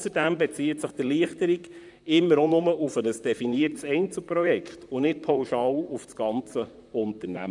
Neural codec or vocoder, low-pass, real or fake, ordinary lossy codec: vocoder, 44.1 kHz, 128 mel bands, Pupu-Vocoder; 10.8 kHz; fake; none